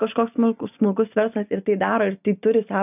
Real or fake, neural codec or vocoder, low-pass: real; none; 3.6 kHz